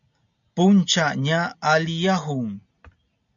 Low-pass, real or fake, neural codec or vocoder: 7.2 kHz; real; none